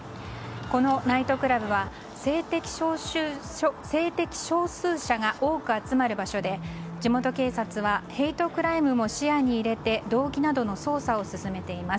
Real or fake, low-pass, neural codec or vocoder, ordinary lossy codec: real; none; none; none